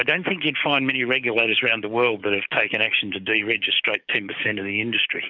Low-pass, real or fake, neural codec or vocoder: 7.2 kHz; fake; codec, 44.1 kHz, 7.8 kbps, DAC